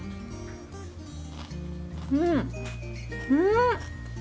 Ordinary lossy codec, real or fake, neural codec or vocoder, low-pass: none; real; none; none